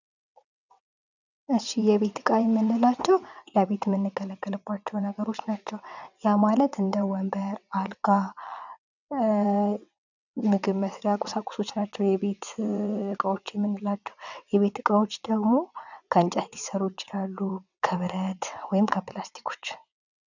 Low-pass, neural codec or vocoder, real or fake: 7.2 kHz; vocoder, 22.05 kHz, 80 mel bands, WaveNeXt; fake